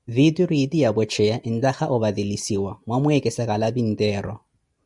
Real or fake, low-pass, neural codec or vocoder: real; 10.8 kHz; none